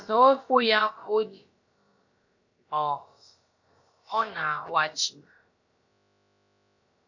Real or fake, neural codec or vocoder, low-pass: fake; codec, 16 kHz, about 1 kbps, DyCAST, with the encoder's durations; 7.2 kHz